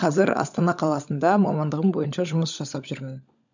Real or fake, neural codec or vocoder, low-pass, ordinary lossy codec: fake; codec, 16 kHz, 16 kbps, FunCodec, trained on LibriTTS, 50 frames a second; 7.2 kHz; none